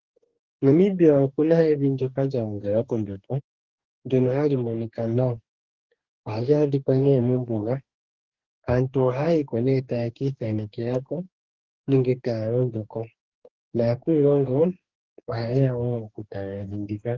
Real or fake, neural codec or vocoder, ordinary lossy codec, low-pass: fake; codec, 44.1 kHz, 2.6 kbps, DAC; Opus, 16 kbps; 7.2 kHz